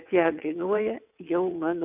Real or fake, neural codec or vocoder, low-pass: fake; vocoder, 22.05 kHz, 80 mel bands, WaveNeXt; 3.6 kHz